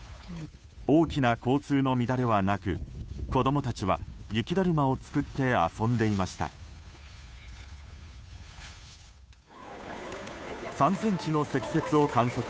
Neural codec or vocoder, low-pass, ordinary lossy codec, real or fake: codec, 16 kHz, 2 kbps, FunCodec, trained on Chinese and English, 25 frames a second; none; none; fake